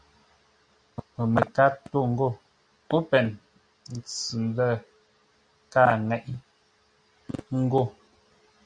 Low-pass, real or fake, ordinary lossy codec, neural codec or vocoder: 9.9 kHz; real; Opus, 64 kbps; none